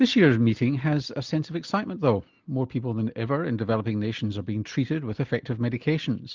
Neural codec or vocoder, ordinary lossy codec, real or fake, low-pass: none; Opus, 24 kbps; real; 7.2 kHz